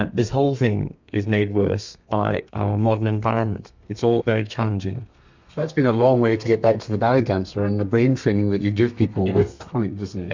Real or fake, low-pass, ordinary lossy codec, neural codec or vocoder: fake; 7.2 kHz; MP3, 64 kbps; codec, 32 kHz, 1.9 kbps, SNAC